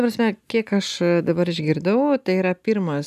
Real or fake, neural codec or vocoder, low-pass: real; none; 14.4 kHz